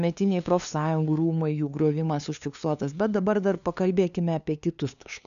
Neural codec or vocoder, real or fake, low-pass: codec, 16 kHz, 2 kbps, FunCodec, trained on LibriTTS, 25 frames a second; fake; 7.2 kHz